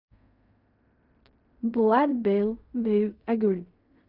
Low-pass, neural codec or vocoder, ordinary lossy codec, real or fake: 5.4 kHz; codec, 16 kHz in and 24 kHz out, 0.4 kbps, LongCat-Audio-Codec, fine tuned four codebook decoder; none; fake